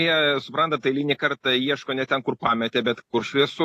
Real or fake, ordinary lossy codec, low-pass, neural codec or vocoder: real; AAC, 48 kbps; 14.4 kHz; none